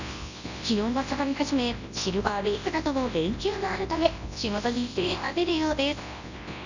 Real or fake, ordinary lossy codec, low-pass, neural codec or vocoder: fake; none; 7.2 kHz; codec, 24 kHz, 0.9 kbps, WavTokenizer, large speech release